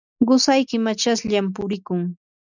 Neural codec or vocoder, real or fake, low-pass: none; real; 7.2 kHz